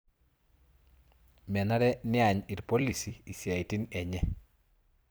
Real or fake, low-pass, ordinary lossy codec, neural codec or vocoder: fake; none; none; vocoder, 44.1 kHz, 128 mel bands every 256 samples, BigVGAN v2